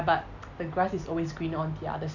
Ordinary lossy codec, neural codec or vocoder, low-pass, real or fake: none; none; 7.2 kHz; real